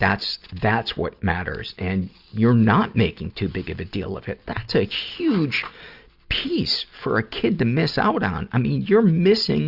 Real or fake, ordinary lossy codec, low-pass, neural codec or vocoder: real; Opus, 64 kbps; 5.4 kHz; none